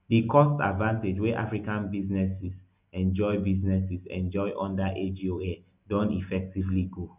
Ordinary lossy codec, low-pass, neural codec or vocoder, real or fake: none; 3.6 kHz; none; real